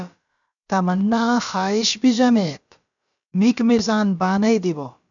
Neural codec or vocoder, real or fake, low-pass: codec, 16 kHz, about 1 kbps, DyCAST, with the encoder's durations; fake; 7.2 kHz